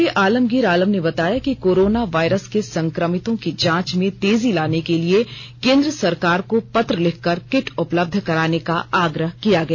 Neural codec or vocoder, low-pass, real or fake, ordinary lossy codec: none; none; real; none